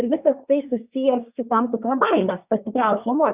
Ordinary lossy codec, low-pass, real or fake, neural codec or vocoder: Opus, 64 kbps; 3.6 kHz; fake; codec, 24 kHz, 1 kbps, SNAC